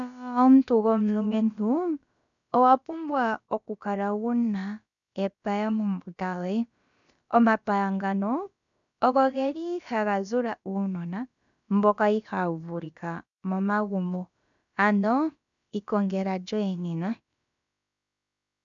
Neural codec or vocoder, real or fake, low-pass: codec, 16 kHz, about 1 kbps, DyCAST, with the encoder's durations; fake; 7.2 kHz